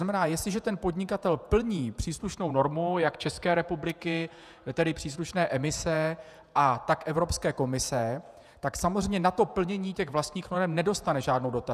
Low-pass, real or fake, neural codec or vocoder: 14.4 kHz; fake; vocoder, 48 kHz, 128 mel bands, Vocos